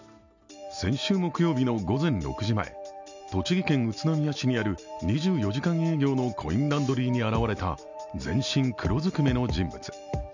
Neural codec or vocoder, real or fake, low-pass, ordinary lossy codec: none; real; 7.2 kHz; none